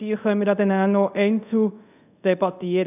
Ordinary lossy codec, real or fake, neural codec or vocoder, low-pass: none; fake; codec, 24 kHz, 0.5 kbps, DualCodec; 3.6 kHz